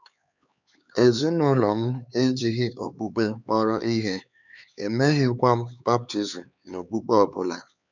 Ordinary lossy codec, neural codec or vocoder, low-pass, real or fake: none; codec, 16 kHz, 4 kbps, X-Codec, HuBERT features, trained on LibriSpeech; 7.2 kHz; fake